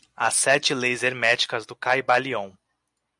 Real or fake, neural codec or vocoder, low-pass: real; none; 10.8 kHz